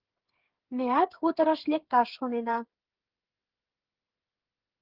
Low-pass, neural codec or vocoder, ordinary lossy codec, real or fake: 5.4 kHz; codec, 16 kHz, 8 kbps, FreqCodec, smaller model; Opus, 16 kbps; fake